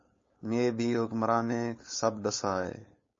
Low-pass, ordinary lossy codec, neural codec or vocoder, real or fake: 7.2 kHz; MP3, 32 kbps; codec, 16 kHz, 4.8 kbps, FACodec; fake